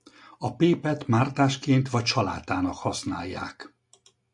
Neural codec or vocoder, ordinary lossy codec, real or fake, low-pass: none; MP3, 64 kbps; real; 10.8 kHz